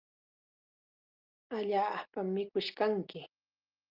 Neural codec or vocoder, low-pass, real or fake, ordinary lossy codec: none; 5.4 kHz; real; Opus, 32 kbps